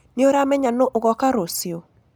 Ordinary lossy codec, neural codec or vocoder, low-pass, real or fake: none; none; none; real